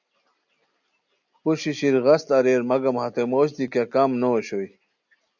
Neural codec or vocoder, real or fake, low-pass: none; real; 7.2 kHz